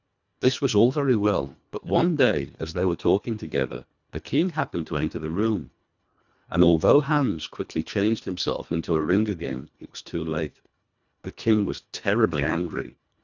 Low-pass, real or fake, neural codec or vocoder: 7.2 kHz; fake; codec, 24 kHz, 1.5 kbps, HILCodec